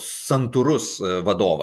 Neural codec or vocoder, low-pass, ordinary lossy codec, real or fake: vocoder, 48 kHz, 128 mel bands, Vocos; 14.4 kHz; MP3, 96 kbps; fake